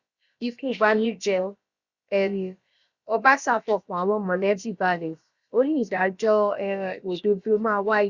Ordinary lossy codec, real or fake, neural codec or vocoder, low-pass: Opus, 64 kbps; fake; codec, 16 kHz, about 1 kbps, DyCAST, with the encoder's durations; 7.2 kHz